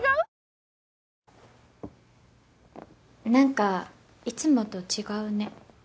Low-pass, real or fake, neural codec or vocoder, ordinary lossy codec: none; real; none; none